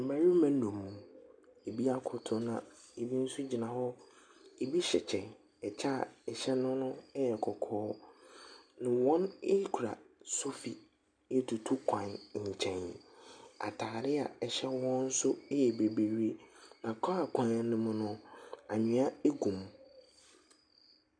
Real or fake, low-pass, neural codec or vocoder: real; 9.9 kHz; none